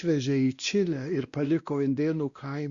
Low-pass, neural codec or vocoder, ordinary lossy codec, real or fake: 7.2 kHz; codec, 16 kHz, 1 kbps, X-Codec, WavLM features, trained on Multilingual LibriSpeech; Opus, 64 kbps; fake